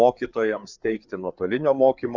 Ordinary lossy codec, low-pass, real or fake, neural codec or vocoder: Opus, 64 kbps; 7.2 kHz; fake; codec, 16 kHz, 4 kbps, FreqCodec, larger model